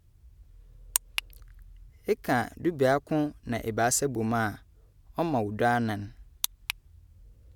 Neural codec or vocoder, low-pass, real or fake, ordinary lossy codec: none; 19.8 kHz; real; none